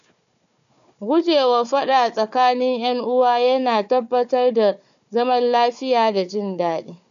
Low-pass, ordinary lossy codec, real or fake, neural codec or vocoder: 7.2 kHz; none; fake; codec, 16 kHz, 4 kbps, FunCodec, trained on Chinese and English, 50 frames a second